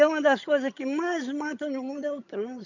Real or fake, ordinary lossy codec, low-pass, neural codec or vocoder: fake; none; 7.2 kHz; vocoder, 22.05 kHz, 80 mel bands, HiFi-GAN